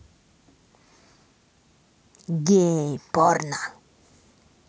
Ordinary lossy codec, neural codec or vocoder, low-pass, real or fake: none; none; none; real